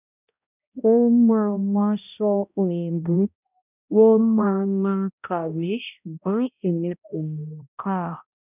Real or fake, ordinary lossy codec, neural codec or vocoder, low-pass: fake; none; codec, 16 kHz, 0.5 kbps, X-Codec, HuBERT features, trained on balanced general audio; 3.6 kHz